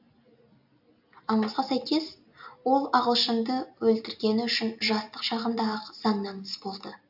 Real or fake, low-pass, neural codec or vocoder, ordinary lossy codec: real; 5.4 kHz; none; AAC, 48 kbps